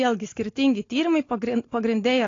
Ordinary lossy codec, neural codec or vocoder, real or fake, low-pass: AAC, 32 kbps; none; real; 7.2 kHz